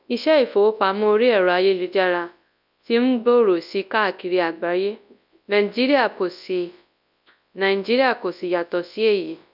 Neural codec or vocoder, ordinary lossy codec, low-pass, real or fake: codec, 24 kHz, 0.9 kbps, WavTokenizer, large speech release; none; 5.4 kHz; fake